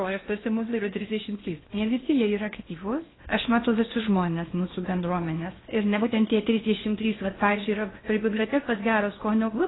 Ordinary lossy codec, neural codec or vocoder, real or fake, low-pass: AAC, 16 kbps; codec, 16 kHz in and 24 kHz out, 0.8 kbps, FocalCodec, streaming, 65536 codes; fake; 7.2 kHz